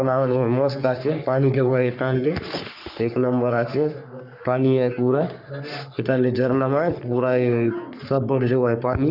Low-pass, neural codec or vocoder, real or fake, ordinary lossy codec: 5.4 kHz; codec, 44.1 kHz, 3.4 kbps, Pupu-Codec; fake; none